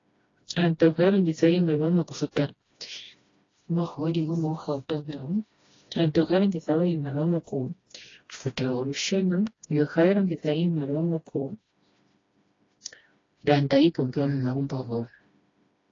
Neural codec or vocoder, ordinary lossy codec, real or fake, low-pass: codec, 16 kHz, 1 kbps, FreqCodec, smaller model; AAC, 32 kbps; fake; 7.2 kHz